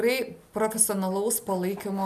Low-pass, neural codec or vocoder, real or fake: 14.4 kHz; none; real